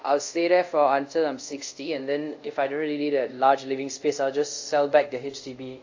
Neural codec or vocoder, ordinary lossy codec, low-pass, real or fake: codec, 24 kHz, 0.5 kbps, DualCodec; AAC, 48 kbps; 7.2 kHz; fake